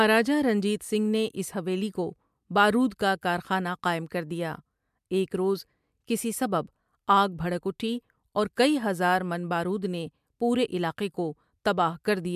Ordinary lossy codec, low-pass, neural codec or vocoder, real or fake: MP3, 96 kbps; 14.4 kHz; none; real